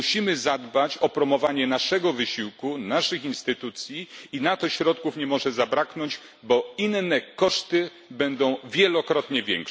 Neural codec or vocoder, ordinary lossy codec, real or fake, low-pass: none; none; real; none